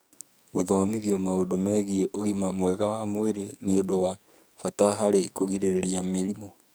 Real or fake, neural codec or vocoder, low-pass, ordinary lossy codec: fake; codec, 44.1 kHz, 2.6 kbps, SNAC; none; none